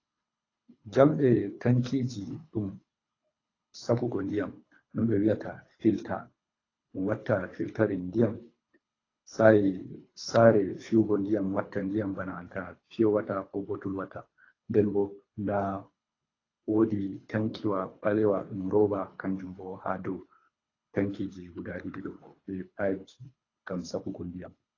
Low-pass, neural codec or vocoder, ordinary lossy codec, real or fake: 7.2 kHz; codec, 24 kHz, 3 kbps, HILCodec; AAC, 32 kbps; fake